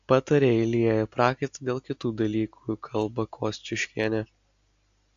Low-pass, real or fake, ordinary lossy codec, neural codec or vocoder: 7.2 kHz; real; AAC, 64 kbps; none